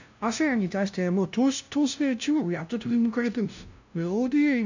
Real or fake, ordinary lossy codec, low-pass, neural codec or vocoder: fake; none; 7.2 kHz; codec, 16 kHz, 0.5 kbps, FunCodec, trained on LibriTTS, 25 frames a second